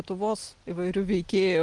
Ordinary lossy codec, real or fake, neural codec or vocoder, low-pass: Opus, 24 kbps; real; none; 10.8 kHz